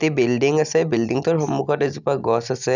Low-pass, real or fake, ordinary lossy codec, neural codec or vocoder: 7.2 kHz; real; none; none